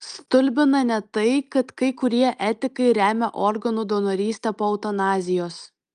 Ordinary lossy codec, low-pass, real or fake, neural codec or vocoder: Opus, 32 kbps; 9.9 kHz; real; none